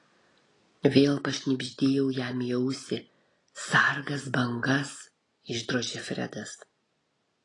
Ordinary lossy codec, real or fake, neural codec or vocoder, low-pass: AAC, 32 kbps; real; none; 10.8 kHz